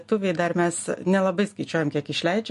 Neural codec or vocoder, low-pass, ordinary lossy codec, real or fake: none; 14.4 kHz; MP3, 48 kbps; real